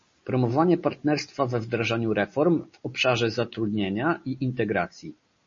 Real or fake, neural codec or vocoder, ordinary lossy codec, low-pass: real; none; MP3, 32 kbps; 7.2 kHz